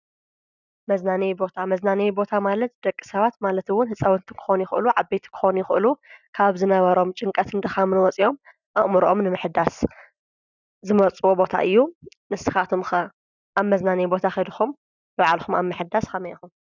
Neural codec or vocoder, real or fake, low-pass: none; real; 7.2 kHz